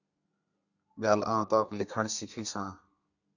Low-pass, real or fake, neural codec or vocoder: 7.2 kHz; fake; codec, 32 kHz, 1.9 kbps, SNAC